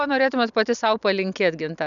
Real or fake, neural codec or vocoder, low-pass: real; none; 7.2 kHz